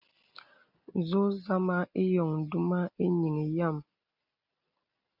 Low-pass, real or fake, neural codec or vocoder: 5.4 kHz; real; none